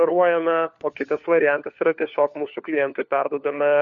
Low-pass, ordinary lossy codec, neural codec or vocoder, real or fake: 7.2 kHz; MP3, 48 kbps; codec, 16 kHz, 4 kbps, FunCodec, trained on LibriTTS, 50 frames a second; fake